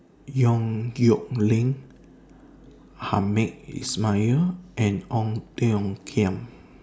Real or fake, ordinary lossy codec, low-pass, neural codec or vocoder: real; none; none; none